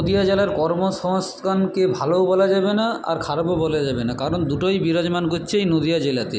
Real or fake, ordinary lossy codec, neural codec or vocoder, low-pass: real; none; none; none